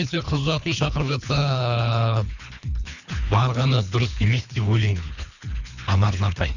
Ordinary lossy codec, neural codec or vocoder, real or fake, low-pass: none; codec, 24 kHz, 3 kbps, HILCodec; fake; 7.2 kHz